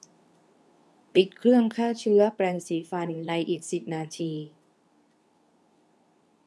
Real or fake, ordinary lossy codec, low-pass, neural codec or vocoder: fake; none; none; codec, 24 kHz, 0.9 kbps, WavTokenizer, medium speech release version 2